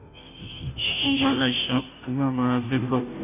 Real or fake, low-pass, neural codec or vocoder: fake; 3.6 kHz; codec, 16 kHz, 0.5 kbps, FunCodec, trained on Chinese and English, 25 frames a second